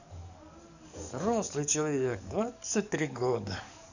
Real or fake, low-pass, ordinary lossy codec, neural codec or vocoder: fake; 7.2 kHz; none; codec, 44.1 kHz, 7.8 kbps, DAC